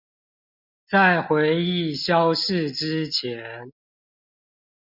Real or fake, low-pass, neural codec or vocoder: real; 5.4 kHz; none